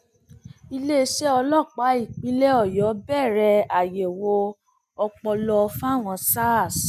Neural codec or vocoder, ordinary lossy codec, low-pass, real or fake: none; none; 14.4 kHz; real